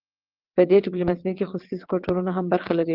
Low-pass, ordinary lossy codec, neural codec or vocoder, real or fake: 5.4 kHz; Opus, 16 kbps; vocoder, 22.05 kHz, 80 mel bands, WaveNeXt; fake